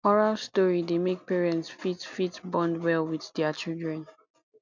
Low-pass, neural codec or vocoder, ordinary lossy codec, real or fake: 7.2 kHz; none; none; real